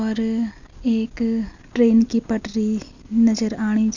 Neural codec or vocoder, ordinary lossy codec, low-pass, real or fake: none; none; 7.2 kHz; real